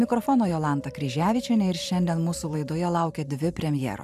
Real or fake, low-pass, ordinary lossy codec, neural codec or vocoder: fake; 14.4 kHz; MP3, 96 kbps; vocoder, 44.1 kHz, 128 mel bands every 512 samples, BigVGAN v2